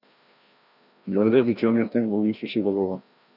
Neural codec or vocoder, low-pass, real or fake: codec, 16 kHz, 1 kbps, FreqCodec, larger model; 5.4 kHz; fake